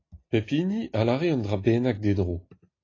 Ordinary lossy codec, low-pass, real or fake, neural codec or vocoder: AAC, 32 kbps; 7.2 kHz; real; none